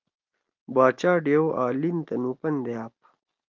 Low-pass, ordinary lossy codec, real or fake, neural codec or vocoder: 7.2 kHz; Opus, 24 kbps; real; none